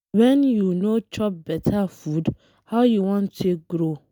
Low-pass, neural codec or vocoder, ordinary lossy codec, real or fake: none; none; none; real